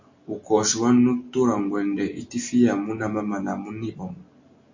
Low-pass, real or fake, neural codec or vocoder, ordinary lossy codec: 7.2 kHz; real; none; MP3, 48 kbps